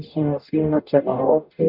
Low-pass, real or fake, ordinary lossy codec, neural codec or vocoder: 5.4 kHz; fake; none; codec, 44.1 kHz, 0.9 kbps, DAC